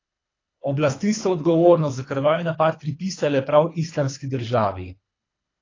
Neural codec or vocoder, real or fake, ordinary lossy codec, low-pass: codec, 24 kHz, 3 kbps, HILCodec; fake; AAC, 32 kbps; 7.2 kHz